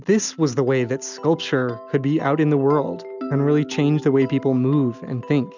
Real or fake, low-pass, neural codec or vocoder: real; 7.2 kHz; none